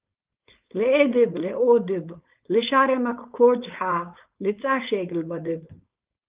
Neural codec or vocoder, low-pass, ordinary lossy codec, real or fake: codec, 16 kHz, 4.8 kbps, FACodec; 3.6 kHz; Opus, 32 kbps; fake